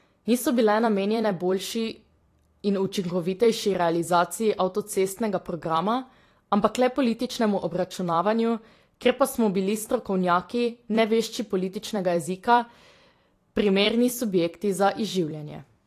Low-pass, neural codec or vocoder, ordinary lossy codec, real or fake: 14.4 kHz; vocoder, 44.1 kHz, 128 mel bands every 256 samples, BigVGAN v2; AAC, 48 kbps; fake